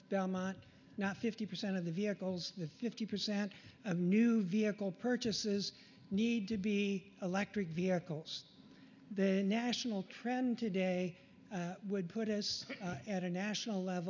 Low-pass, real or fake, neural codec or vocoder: 7.2 kHz; real; none